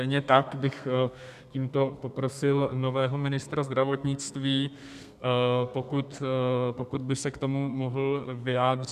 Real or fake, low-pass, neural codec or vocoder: fake; 14.4 kHz; codec, 32 kHz, 1.9 kbps, SNAC